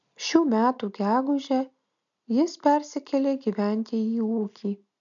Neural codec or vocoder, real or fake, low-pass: none; real; 7.2 kHz